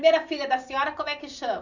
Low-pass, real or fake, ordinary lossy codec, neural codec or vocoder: 7.2 kHz; real; none; none